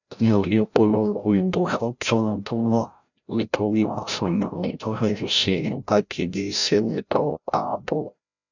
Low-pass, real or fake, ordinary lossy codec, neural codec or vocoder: 7.2 kHz; fake; none; codec, 16 kHz, 0.5 kbps, FreqCodec, larger model